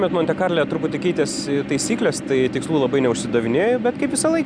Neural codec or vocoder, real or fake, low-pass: none; real; 9.9 kHz